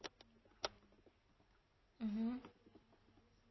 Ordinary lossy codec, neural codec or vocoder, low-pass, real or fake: MP3, 24 kbps; none; 7.2 kHz; real